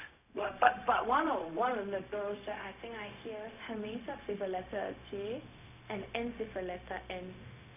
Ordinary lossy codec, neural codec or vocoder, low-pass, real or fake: none; codec, 16 kHz, 0.4 kbps, LongCat-Audio-Codec; 3.6 kHz; fake